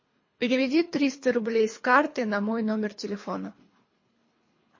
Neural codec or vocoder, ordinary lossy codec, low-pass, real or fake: codec, 24 kHz, 3 kbps, HILCodec; MP3, 32 kbps; 7.2 kHz; fake